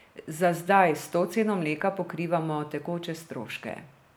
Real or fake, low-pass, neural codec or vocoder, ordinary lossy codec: real; none; none; none